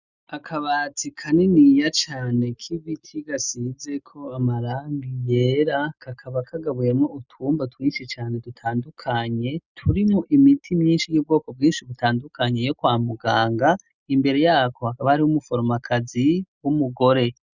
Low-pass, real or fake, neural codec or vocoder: 7.2 kHz; real; none